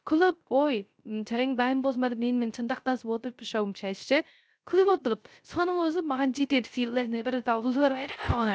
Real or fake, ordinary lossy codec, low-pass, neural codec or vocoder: fake; none; none; codec, 16 kHz, 0.3 kbps, FocalCodec